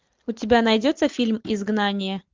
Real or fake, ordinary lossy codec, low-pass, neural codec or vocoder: real; Opus, 24 kbps; 7.2 kHz; none